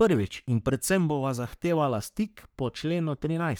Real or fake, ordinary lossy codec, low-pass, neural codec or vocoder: fake; none; none; codec, 44.1 kHz, 3.4 kbps, Pupu-Codec